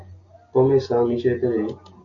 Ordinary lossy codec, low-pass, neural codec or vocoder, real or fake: AAC, 48 kbps; 7.2 kHz; none; real